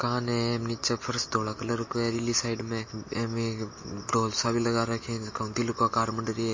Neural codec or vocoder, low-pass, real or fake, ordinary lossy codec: none; 7.2 kHz; real; MP3, 32 kbps